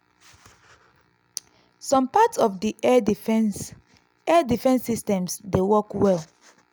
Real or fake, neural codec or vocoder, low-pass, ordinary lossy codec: real; none; none; none